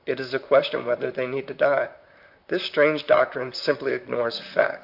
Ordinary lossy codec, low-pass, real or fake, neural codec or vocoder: AAC, 48 kbps; 5.4 kHz; fake; vocoder, 44.1 kHz, 128 mel bands, Pupu-Vocoder